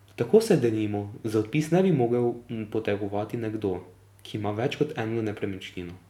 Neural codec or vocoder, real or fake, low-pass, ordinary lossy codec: none; real; 19.8 kHz; none